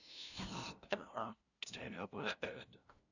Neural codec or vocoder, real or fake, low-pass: codec, 16 kHz, 0.5 kbps, FunCodec, trained on LibriTTS, 25 frames a second; fake; 7.2 kHz